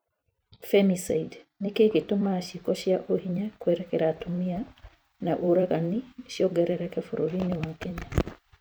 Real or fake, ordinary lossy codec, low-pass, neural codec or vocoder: fake; none; none; vocoder, 44.1 kHz, 128 mel bands every 256 samples, BigVGAN v2